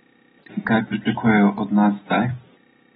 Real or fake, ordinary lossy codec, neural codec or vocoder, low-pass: real; AAC, 16 kbps; none; 10.8 kHz